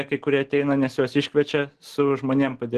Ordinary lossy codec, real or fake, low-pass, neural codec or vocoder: Opus, 16 kbps; real; 14.4 kHz; none